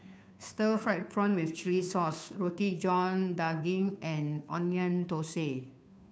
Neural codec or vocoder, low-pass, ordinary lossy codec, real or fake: codec, 16 kHz, 2 kbps, FunCodec, trained on Chinese and English, 25 frames a second; none; none; fake